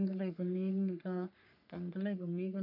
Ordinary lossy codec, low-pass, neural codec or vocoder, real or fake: none; 5.4 kHz; codec, 44.1 kHz, 3.4 kbps, Pupu-Codec; fake